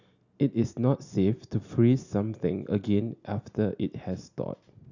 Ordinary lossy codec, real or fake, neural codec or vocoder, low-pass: none; real; none; 7.2 kHz